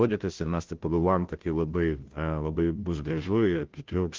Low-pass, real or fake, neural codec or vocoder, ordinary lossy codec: 7.2 kHz; fake; codec, 16 kHz, 0.5 kbps, FunCodec, trained on Chinese and English, 25 frames a second; Opus, 16 kbps